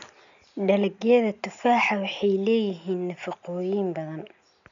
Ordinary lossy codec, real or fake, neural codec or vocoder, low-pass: none; real; none; 7.2 kHz